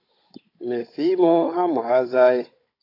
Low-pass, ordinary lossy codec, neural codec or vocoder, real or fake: 5.4 kHz; AAC, 32 kbps; codec, 16 kHz, 16 kbps, FunCodec, trained on Chinese and English, 50 frames a second; fake